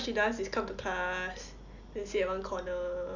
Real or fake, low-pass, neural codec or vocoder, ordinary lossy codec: real; 7.2 kHz; none; none